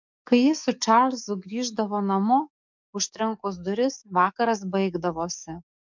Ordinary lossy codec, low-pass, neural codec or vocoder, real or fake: MP3, 64 kbps; 7.2 kHz; codec, 44.1 kHz, 7.8 kbps, DAC; fake